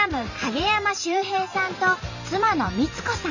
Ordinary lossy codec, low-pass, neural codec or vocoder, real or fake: none; 7.2 kHz; vocoder, 44.1 kHz, 128 mel bands every 512 samples, BigVGAN v2; fake